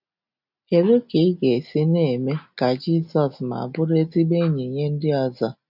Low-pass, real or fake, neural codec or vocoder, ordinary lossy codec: 5.4 kHz; real; none; none